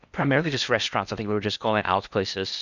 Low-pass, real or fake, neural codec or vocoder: 7.2 kHz; fake; codec, 16 kHz in and 24 kHz out, 0.8 kbps, FocalCodec, streaming, 65536 codes